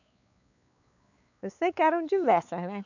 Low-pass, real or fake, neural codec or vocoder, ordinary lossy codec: 7.2 kHz; fake; codec, 16 kHz, 4 kbps, X-Codec, WavLM features, trained on Multilingual LibriSpeech; none